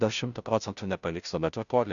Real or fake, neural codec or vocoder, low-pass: fake; codec, 16 kHz, 0.5 kbps, FunCodec, trained on Chinese and English, 25 frames a second; 7.2 kHz